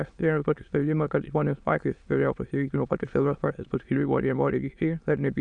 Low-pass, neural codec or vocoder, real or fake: 9.9 kHz; autoencoder, 22.05 kHz, a latent of 192 numbers a frame, VITS, trained on many speakers; fake